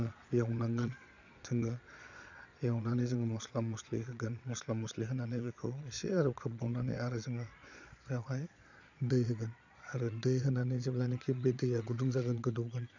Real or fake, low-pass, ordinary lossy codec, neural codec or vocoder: fake; 7.2 kHz; none; vocoder, 22.05 kHz, 80 mel bands, Vocos